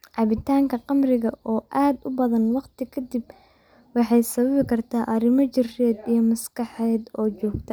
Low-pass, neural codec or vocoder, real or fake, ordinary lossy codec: none; none; real; none